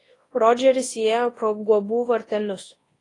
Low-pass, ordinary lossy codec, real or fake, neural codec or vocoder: 10.8 kHz; AAC, 32 kbps; fake; codec, 24 kHz, 0.9 kbps, WavTokenizer, large speech release